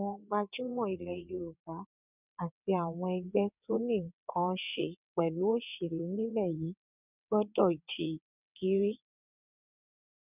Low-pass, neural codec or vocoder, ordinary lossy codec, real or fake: 3.6 kHz; vocoder, 22.05 kHz, 80 mel bands, WaveNeXt; none; fake